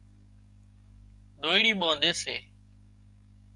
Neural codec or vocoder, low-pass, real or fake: codec, 44.1 kHz, 7.8 kbps, DAC; 10.8 kHz; fake